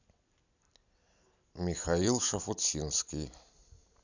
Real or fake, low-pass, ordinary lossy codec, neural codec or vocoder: real; 7.2 kHz; none; none